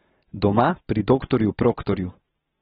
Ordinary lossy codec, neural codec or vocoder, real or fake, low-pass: AAC, 16 kbps; none; real; 19.8 kHz